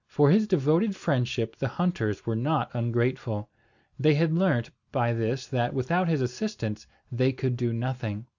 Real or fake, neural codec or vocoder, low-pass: real; none; 7.2 kHz